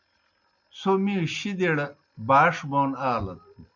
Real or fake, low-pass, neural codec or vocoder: real; 7.2 kHz; none